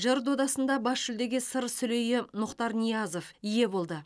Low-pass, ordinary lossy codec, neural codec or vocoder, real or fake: none; none; none; real